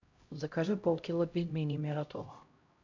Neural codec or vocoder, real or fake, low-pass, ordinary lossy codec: codec, 16 kHz, 0.5 kbps, X-Codec, HuBERT features, trained on LibriSpeech; fake; 7.2 kHz; MP3, 48 kbps